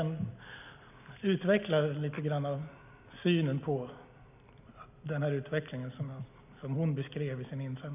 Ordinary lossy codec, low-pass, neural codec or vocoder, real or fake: none; 3.6 kHz; none; real